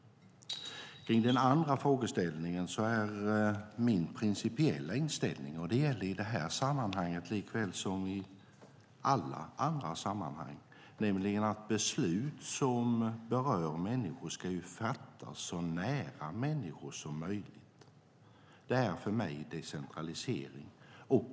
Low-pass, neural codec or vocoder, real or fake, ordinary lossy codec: none; none; real; none